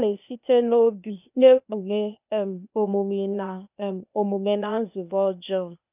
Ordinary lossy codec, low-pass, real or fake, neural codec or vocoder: none; 3.6 kHz; fake; codec, 16 kHz, 0.8 kbps, ZipCodec